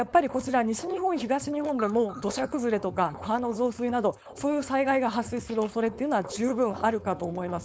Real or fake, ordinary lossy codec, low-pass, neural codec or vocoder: fake; none; none; codec, 16 kHz, 4.8 kbps, FACodec